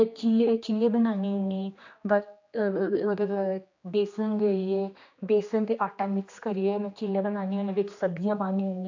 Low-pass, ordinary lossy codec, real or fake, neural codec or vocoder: 7.2 kHz; AAC, 48 kbps; fake; codec, 16 kHz, 2 kbps, X-Codec, HuBERT features, trained on general audio